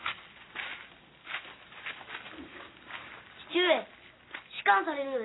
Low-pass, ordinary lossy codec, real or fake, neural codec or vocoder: 7.2 kHz; AAC, 16 kbps; real; none